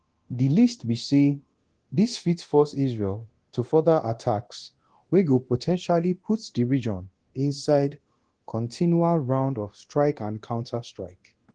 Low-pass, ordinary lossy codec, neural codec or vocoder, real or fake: 9.9 kHz; Opus, 16 kbps; codec, 24 kHz, 0.9 kbps, DualCodec; fake